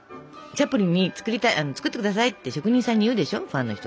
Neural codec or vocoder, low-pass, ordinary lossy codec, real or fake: none; none; none; real